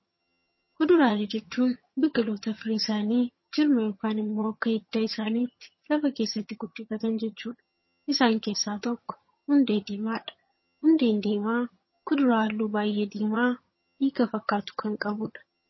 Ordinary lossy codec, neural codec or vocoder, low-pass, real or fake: MP3, 24 kbps; vocoder, 22.05 kHz, 80 mel bands, HiFi-GAN; 7.2 kHz; fake